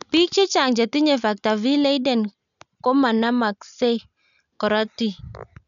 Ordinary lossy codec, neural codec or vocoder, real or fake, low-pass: none; none; real; 7.2 kHz